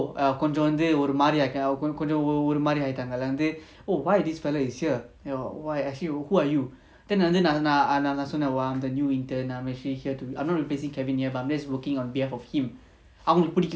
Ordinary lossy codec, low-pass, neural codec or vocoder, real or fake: none; none; none; real